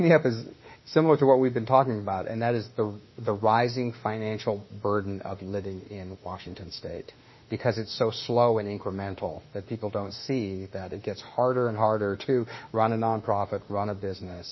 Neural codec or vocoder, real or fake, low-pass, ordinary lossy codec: autoencoder, 48 kHz, 32 numbers a frame, DAC-VAE, trained on Japanese speech; fake; 7.2 kHz; MP3, 24 kbps